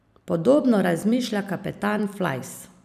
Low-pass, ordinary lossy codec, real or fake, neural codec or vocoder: 14.4 kHz; none; fake; vocoder, 44.1 kHz, 128 mel bands every 256 samples, BigVGAN v2